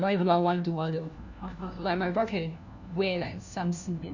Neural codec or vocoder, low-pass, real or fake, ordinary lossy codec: codec, 16 kHz, 1 kbps, FunCodec, trained on LibriTTS, 50 frames a second; 7.2 kHz; fake; none